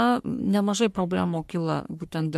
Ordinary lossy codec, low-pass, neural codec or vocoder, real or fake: MP3, 64 kbps; 14.4 kHz; codec, 44.1 kHz, 3.4 kbps, Pupu-Codec; fake